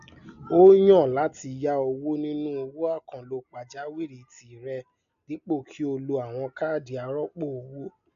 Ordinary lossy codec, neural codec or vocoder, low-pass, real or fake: none; none; 7.2 kHz; real